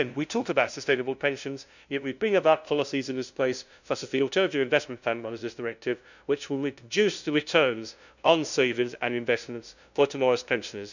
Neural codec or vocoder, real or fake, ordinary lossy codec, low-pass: codec, 16 kHz, 0.5 kbps, FunCodec, trained on LibriTTS, 25 frames a second; fake; none; 7.2 kHz